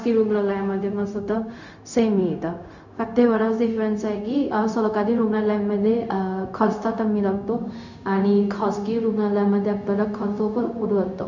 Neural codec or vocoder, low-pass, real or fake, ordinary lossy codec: codec, 16 kHz, 0.4 kbps, LongCat-Audio-Codec; 7.2 kHz; fake; none